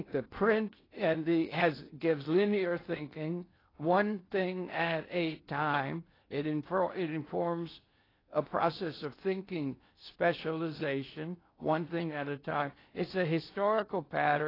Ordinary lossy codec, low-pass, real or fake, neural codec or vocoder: AAC, 24 kbps; 5.4 kHz; fake; codec, 16 kHz in and 24 kHz out, 0.8 kbps, FocalCodec, streaming, 65536 codes